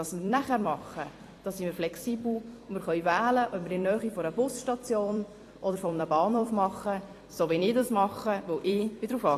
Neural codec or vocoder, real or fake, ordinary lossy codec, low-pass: vocoder, 44.1 kHz, 128 mel bands every 256 samples, BigVGAN v2; fake; AAC, 48 kbps; 14.4 kHz